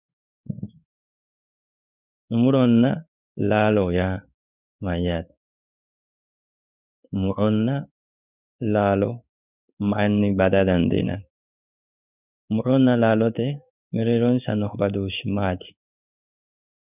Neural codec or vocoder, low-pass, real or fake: codec, 16 kHz in and 24 kHz out, 1 kbps, XY-Tokenizer; 3.6 kHz; fake